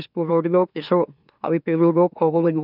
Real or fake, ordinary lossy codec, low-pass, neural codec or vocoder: fake; none; 5.4 kHz; autoencoder, 44.1 kHz, a latent of 192 numbers a frame, MeloTTS